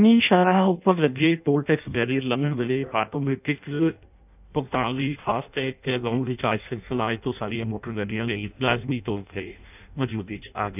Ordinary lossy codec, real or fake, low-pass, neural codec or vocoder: none; fake; 3.6 kHz; codec, 16 kHz in and 24 kHz out, 0.6 kbps, FireRedTTS-2 codec